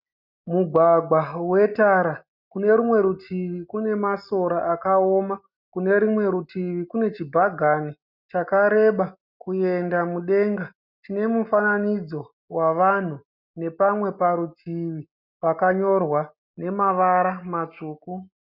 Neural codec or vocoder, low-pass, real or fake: none; 5.4 kHz; real